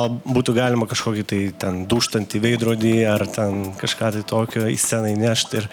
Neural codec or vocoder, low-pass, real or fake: none; 19.8 kHz; real